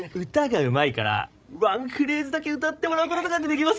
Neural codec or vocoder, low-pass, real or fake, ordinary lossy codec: codec, 16 kHz, 16 kbps, FreqCodec, larger model; none; fake; none